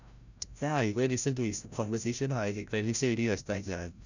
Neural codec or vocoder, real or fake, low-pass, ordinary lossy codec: codec, 16 kHz, 0.5 kbps, FreqCodec, larger model; fake; 7.2 kHz; none